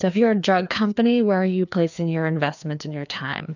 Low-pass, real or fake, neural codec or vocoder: 7.2 kHz; fake; codec, 16 kHz, 2 kbps, FreqCodec, larger model